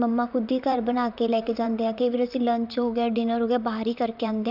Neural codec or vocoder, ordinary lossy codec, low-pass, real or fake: vocoder, 44.1 kHz, 128 mel bands, Pupu-Vocoder; none; 5.4 kHz; fake